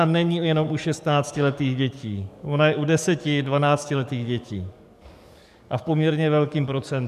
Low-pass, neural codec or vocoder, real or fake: 14.4 kHz; codec, 44.1 kHz, 7.8 kbps, DAC; fake